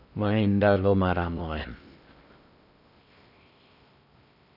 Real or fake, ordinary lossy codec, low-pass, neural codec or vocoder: fake; none; 5.4 kHz; codec, 16 kHz in and 24 kHz out, 0.6 kbps, FocalCodec, streaming, 2048 codes